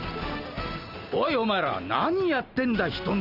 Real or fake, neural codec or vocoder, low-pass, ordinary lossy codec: real; none; 5.4 kHz; Opus, 24 kbps